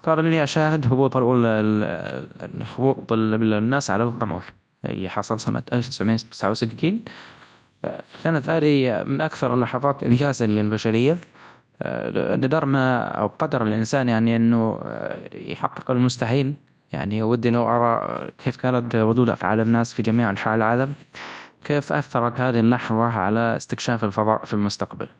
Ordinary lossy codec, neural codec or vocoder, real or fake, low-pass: none; codec, 24 kHz, 0.9 kbps, WavTokenizer, large speech release; fake; 10.8 kHz